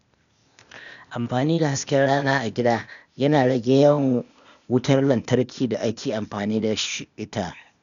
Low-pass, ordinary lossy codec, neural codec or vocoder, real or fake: 7.2 kHz; none; codec, 16 kHz, 0.8 kbps, ZipCodec; fake